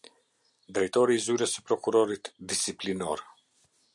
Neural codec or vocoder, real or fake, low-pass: none; real; 10.8 kHz